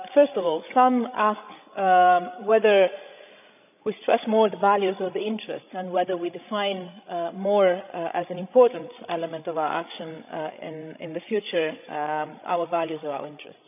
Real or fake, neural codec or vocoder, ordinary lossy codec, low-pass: fake; codec, 16 kHz, 16 kbps, FreqCodec, larger model; none; 3.6 kHz